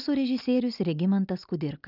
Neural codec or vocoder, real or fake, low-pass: none; real; 5.4 kHz